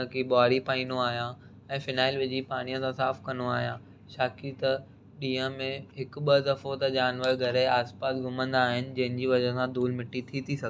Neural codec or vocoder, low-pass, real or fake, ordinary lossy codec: none; none; real; none